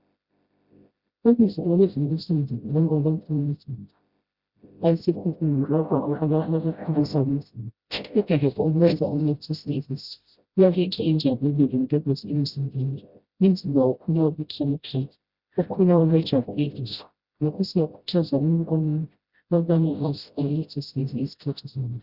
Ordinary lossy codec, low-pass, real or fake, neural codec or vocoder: Opus, 64 kbps; 5.4 kHz; fake; codec, 16 kHz, 0.5 kbps, FreqCodec, smaller model